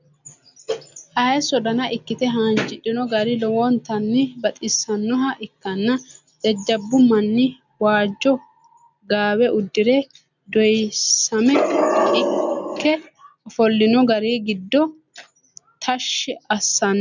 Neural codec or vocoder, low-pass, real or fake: none; 7.2 kHz; real